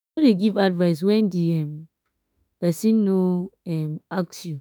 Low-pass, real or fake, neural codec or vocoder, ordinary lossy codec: none; fake; autoencoder, 48 kHz, 32 numbers a frame, DAC-VAE, trained on Japanese speech; none